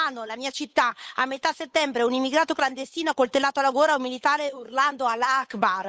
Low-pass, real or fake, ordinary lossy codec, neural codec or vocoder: none; fake; none; codec, 16 kHz, 8 kbps, FunCodec, trained on Chinese and English, 25 frames a second